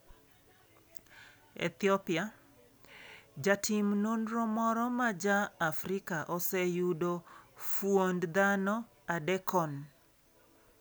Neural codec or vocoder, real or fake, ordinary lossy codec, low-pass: none; real; none; none